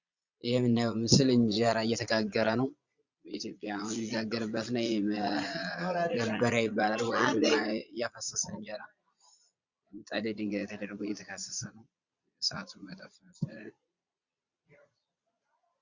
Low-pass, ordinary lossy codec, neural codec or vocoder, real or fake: 7.2 kHz; Opus, 64 kbps; vocoder, 22.05 kHz, 80 mel bands, Vocos; fake